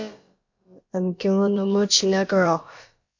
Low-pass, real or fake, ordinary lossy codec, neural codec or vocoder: 7.2 kHz; fake; MP3, 48 kbps; codec, 16 kHz, about 1 kbps, DyCAST, with the encoder's durations